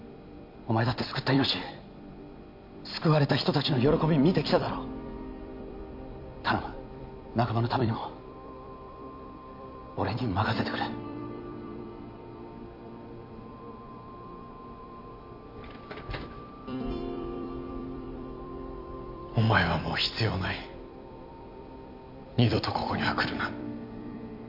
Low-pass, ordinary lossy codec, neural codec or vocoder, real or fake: 5.4 kHz; MP3, 48 kbps; vocoder, 44.1 kHz, 128 mel bands every 256 samples, BigVGAN v2; fake